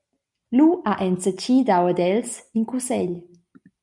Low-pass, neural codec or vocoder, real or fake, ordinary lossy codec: 10.8 kHz; none; real; MP3, 96 kbps